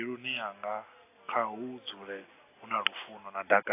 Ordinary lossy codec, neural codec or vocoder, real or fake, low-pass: AAC, 24 kbps; none; real; 3.6 kHz